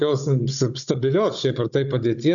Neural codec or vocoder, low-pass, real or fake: codec, 16 kHz, 16 kbps, FunCodec, trained on Chinese and English, 50 frames a second; 7.2 kHz; fake